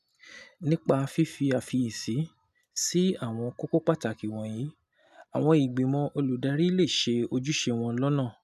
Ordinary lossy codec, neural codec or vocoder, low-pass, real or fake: none; none; 14.4 kHz; real